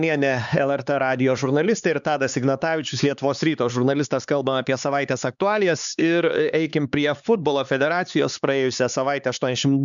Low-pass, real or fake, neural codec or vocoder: 7.2 kHz; fake; codec, 16 kHz, 4 kbps, X-Codec, HuBERT features, trained on LibriSpeech